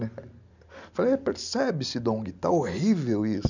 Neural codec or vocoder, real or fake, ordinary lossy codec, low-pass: none; real; none; 7.2 kHz